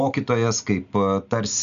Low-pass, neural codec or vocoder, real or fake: 7.2 kHz; none; real